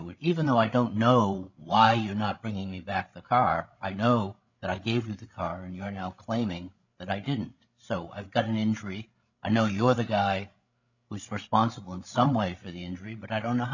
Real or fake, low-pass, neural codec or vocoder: fake; 7.2 kHz; codec, 16 kHz, 16 kbps, FreqCodec, larger model